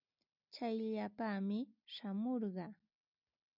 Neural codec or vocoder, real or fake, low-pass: none; real; 5.4 kHz